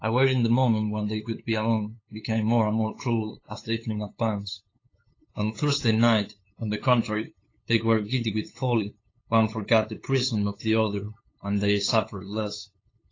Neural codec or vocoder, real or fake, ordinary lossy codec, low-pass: codec, 16 kHz, 8 kbps, FunCodec, trained on LibriTTS, 25 frames a second; fake; AAC, 32 kbps; 7.2 kHz